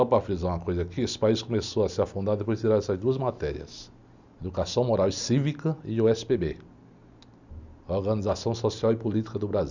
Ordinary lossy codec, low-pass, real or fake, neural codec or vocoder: none; 7.2 kHz; real; none